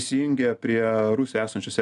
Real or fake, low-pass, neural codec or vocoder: real; 10.8 kHz; none